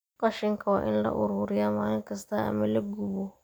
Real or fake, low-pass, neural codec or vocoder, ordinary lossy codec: real; none; none; none